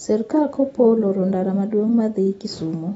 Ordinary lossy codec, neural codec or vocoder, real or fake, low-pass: AAC, 24 kbps; none; real; 19.8 kHz